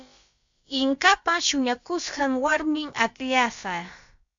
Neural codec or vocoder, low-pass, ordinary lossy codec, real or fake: codec, 16 kHz, about 1 kbps, DyCAST, with the encoder's durations; 7.2 kHz; AAC, 64 kbps; fake